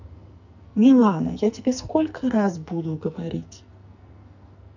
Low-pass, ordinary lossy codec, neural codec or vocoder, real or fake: 7.2 kHz; none; codec, 44.1 kHz, 2.6 kbps, SNAC; fake